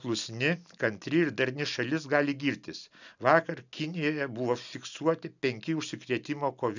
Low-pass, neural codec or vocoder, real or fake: 7.2 kHz; none; real